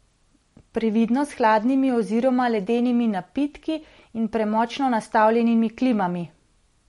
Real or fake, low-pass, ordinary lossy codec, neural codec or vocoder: real; 19.8 kHz; MP3, 48 kbps; none